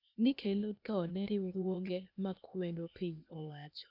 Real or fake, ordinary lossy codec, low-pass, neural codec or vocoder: fake; none; 5.4 kHz; codec, 16 kHz, 0.8 kbps, ZipCodec